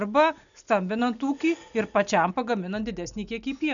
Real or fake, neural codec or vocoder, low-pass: real; none; 7.2 kHz